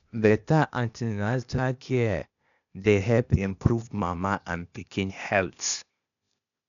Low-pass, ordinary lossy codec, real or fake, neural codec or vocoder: 7.2 kHz; none; fake; codec, 16 kHz, 0.8 kbps, ZipCodec